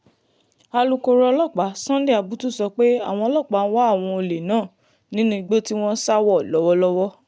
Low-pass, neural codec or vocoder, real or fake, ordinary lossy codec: none; none; real; none